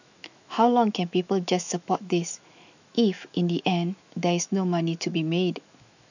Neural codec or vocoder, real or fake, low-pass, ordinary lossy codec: autoencoder, 48 kHz, 128 numbers a frame, DAC-VAE, trained on Japanese speech; fake; 7.2 kHz; none